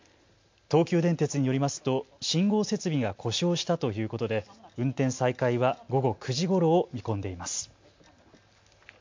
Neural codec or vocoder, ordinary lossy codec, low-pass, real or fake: none; MP3, 48 kbps; 7.2 kHz; real